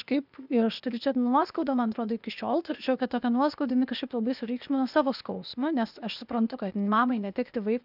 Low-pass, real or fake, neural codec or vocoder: 5.4 kHz; fake; codec, 16 kHz, 0.8 kbps, ZipCodec